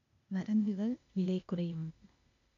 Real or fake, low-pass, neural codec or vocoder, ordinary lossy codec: fake; 7.2 kHz; codec, 16 kHz, 0.8 kbps, ZipCodec; none